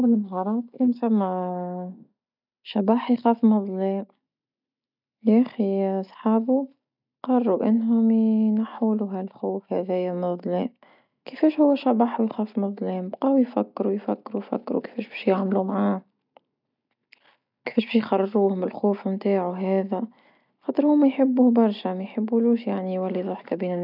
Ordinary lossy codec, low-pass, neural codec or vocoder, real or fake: none; 5.4 kHz; none; real